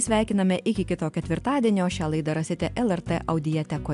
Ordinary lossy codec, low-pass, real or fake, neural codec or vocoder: AAC, 96 kbps; 10.8 kHz; real; none